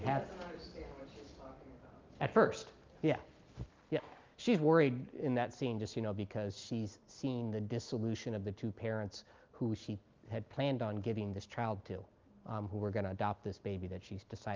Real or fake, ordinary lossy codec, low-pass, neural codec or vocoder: real; Opus, 32 kbps; 7.2 kHz; none